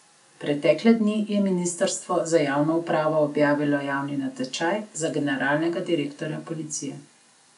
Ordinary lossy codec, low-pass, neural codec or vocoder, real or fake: none; 10.8 kHz; none; real